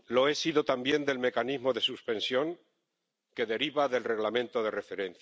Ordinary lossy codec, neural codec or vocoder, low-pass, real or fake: none; none; none; real